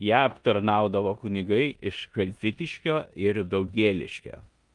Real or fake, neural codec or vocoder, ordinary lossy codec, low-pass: fake; codec, 16 kHz in and 24 kHz out, 0.9 kbps, LongCat-Audio-Codec, four codebook decoder; Opus, 32 kbps; 10.8 kHz